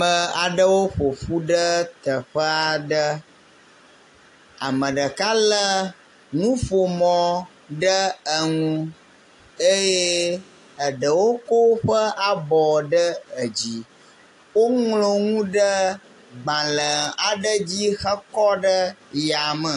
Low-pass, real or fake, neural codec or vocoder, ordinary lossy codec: 10.8 kHz; real; none; AAC, 48 kbps